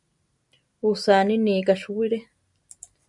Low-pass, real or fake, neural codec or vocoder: 10.8 kHz; real; none